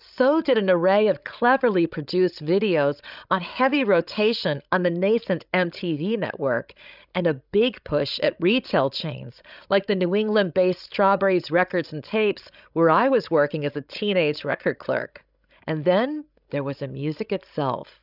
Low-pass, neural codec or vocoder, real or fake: 5.4 kHz; codec, 16 kHz, 16 kbps, FreqCodec, larger model; fake